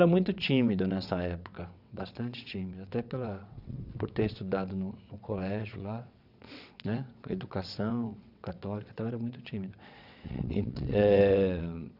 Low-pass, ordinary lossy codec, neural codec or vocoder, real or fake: 5.4 kHz; none; vocoder, 22.05 kHz, 80 mel bands, WaveNeXt; fake